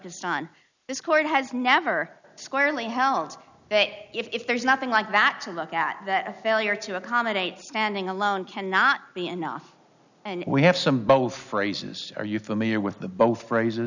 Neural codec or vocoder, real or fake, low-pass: none; real; 7.2 kHz